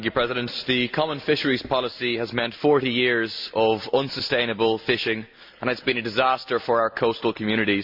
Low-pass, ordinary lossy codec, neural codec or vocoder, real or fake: 5.4 kHz; MP3, 48 kbps; none; real